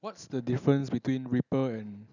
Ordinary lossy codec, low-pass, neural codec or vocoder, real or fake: none; 7.2 kHz; none; real